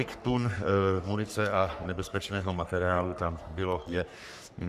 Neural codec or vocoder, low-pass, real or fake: codec, 44.1 kHz, 3.4 kbps, Pupu-Codec; 14.4 kHz; fake